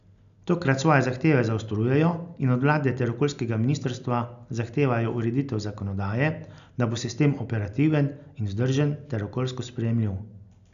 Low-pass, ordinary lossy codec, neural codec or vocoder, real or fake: 7.2 kHz; none; none; real